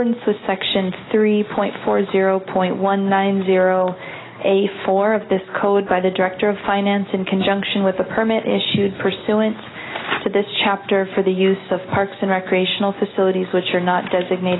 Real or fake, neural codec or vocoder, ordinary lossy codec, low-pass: real; none; AAC, 16 kbps; 7.2 kHz